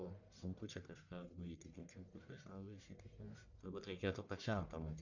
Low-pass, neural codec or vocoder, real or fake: 7.2 kHz; codec, 44.1 kHz, 1.7 kbps, Pupu-Codec; fake